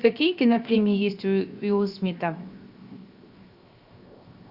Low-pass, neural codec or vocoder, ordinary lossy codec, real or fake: 5.4 kHz; codec, 16 kHz, 0.7 kbps, FocalCodec; Opus, 64 kbps; fake